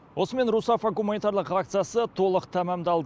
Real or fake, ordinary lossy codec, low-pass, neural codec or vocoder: real; none; none; none